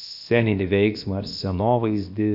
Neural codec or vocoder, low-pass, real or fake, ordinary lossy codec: codec, 16 kHz, about 1 kbps, DyCAST, with the encoder's durations; 5.4 kHz; fake; AAC, 48 kbps